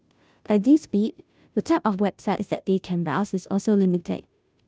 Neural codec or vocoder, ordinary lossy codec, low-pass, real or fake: codec, 16 kHz, 0.5 kbps, FunCodec, trained on Chinese and English, 25 frames a second; none; none; fake